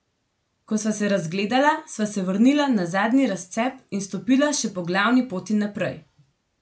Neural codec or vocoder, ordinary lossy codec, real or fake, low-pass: none; none; real; none